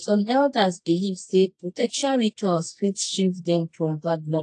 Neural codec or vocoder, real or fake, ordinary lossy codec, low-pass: codec, 24 kHz, 0.9 kbps, WavTokenizer, medium music audio release; fake; AAC, 48 kbps; 10.8 kHz